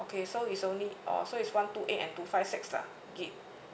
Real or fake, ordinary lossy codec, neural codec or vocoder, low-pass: real; none; none; none